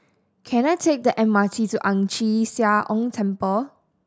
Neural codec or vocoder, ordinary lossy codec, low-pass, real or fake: none; none; none; real